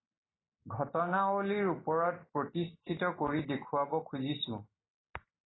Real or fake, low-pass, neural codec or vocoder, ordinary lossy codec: real; 7.2 kHz; none; AAC, 16 kbps